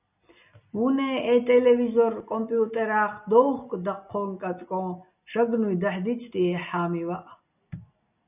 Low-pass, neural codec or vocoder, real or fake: 3.6 kHz; none; real